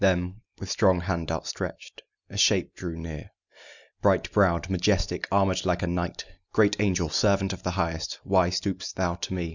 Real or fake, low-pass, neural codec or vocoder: real; 7.2 kHz; none